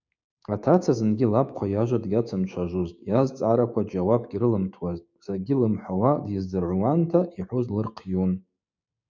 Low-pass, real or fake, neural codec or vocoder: 7.2 kHz; fake; codec, 16 kHz, 6 kbps, DAC